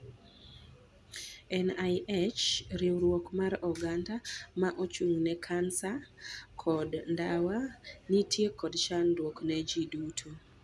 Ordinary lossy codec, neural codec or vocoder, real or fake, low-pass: none; none; real; none